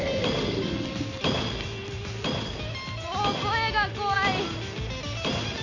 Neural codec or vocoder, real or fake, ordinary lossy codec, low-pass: none; real; none; 7.2 kHz